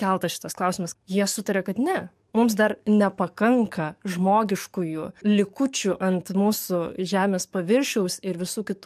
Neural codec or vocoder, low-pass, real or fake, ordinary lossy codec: codec, 44.1 kHz, 7.8 kbps, DAC; 14.4 kHz; fake; MP3, 96 kbps